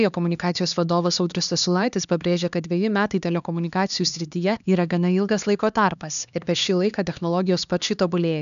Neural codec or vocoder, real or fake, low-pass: codec, 16 kHz, 2 kbps, X-Codec, HuBERT features, trained on LibriSpeech; fake; 7.2 kHz